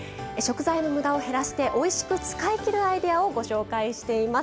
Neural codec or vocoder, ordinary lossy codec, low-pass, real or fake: none; none; none; real